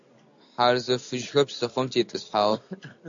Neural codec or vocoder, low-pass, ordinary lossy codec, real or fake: none; 7.2 kHz; AAC, 64 kbps; real